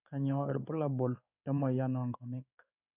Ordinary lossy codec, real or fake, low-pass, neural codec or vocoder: none; fake; 3.6 kHz; codec, 16 kHz in and 24 kHz out, 1 kbps, XY-Tokenizer